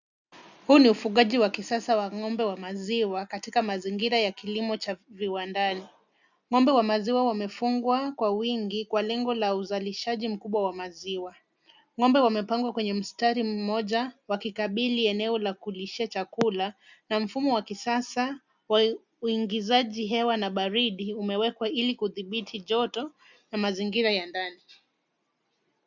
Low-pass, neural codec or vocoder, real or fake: 7.2 kHz; none; real